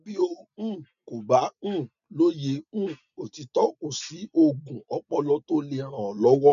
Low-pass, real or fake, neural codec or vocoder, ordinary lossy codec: 7.2 kHz; real; none; none